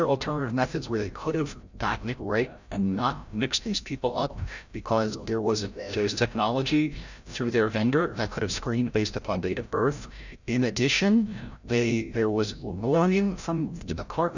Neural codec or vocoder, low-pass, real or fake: codec, 16 kHz, 0.5 kbps, FreqCodec, larger model; 7.2 kHz; fake